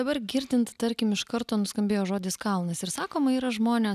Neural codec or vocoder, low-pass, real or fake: none; 14.4 kHz; real